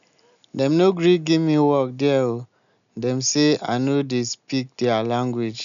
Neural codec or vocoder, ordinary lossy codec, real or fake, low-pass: none; none; real; 7.2 kHz